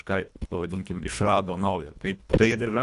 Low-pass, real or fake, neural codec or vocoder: 10.8 kHz; fake; codec, 24 kHz, 1.5 kbps, HILCodec